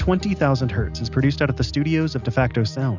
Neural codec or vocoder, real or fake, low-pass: none; real; 7.2 kHz